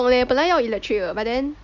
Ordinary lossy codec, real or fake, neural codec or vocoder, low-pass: Opus, 64 kbps; real; none; 7.2 kHz